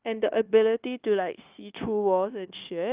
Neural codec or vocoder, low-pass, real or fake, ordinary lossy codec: codec, 16 kHz, 0.9 kbps, LongCat-Audio-Codec; 3.6 kHz; fake; Opus, 32 kbps